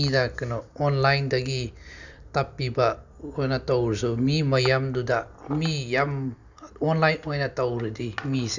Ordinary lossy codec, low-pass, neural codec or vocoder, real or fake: none; 7.2 kHz; none; real